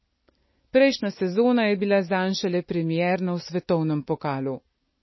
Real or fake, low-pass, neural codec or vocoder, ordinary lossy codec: real; 7.2 kHz; none; MP3, 24 kbps